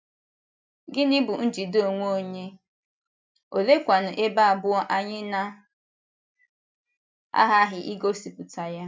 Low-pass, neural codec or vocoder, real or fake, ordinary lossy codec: none; none; real; none